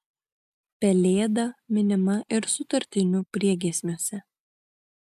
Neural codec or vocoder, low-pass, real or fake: none; 14.4 kHz; real